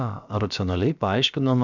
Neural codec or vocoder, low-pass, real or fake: codec, 16 kHz, about 1 kbps, DyCAST, with the encoder's durations; 7.2 kHz; fake